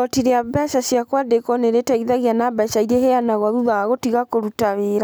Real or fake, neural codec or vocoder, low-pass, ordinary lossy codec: real; none; none; none